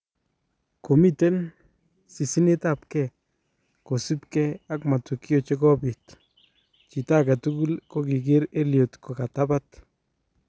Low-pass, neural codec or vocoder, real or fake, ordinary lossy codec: none; none; real; none